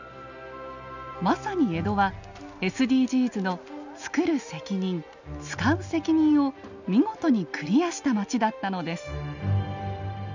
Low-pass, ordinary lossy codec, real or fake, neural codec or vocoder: 7.2 kHz; none; real; none